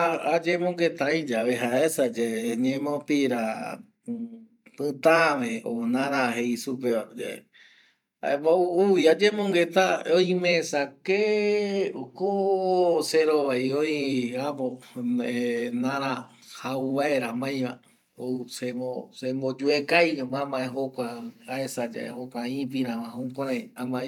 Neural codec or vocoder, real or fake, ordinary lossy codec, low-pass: vocoder, 44.1 kHz, 128 mel bands every 512 samples, BigVGAN v2; fake; none; 19.8 kHz